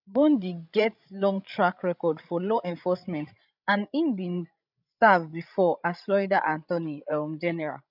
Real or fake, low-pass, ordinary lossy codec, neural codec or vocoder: fake; 5.4 kHz; none; codec, 16 kHz, 16 kbps, FreqCodec, larger model